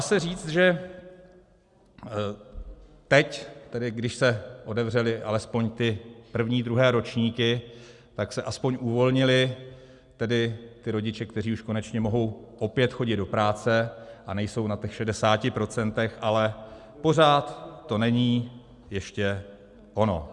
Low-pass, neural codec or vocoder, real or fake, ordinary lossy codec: 10.8 kHz; none; real; Opus, 64 kbps